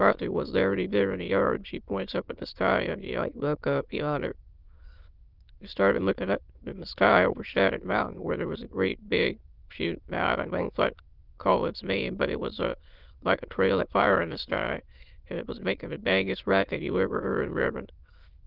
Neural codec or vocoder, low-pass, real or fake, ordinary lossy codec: autoencoder, 22.05 kHz, a latent of 192 numbers a frame, VITS, trained on many speakers; 5.4 kHz; fake; Opus, 32 kbps